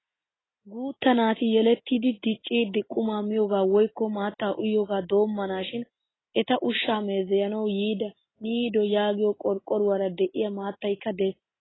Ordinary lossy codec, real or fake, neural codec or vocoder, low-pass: AAC, 16 kbps; real; none; 7.2 kHz